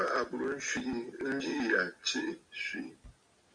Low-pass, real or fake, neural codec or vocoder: 9.9 kHz; real; none